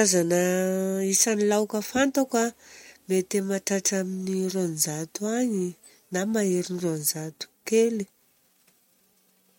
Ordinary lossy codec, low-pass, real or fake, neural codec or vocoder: MP3, 64 kbps; 19.8 kHz; real; none